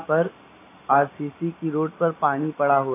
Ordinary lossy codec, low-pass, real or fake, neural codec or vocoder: AAC, 24 kbps; 3.6 kHz; real; none